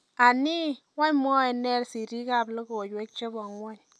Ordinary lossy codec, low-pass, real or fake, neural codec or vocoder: none; none; real; none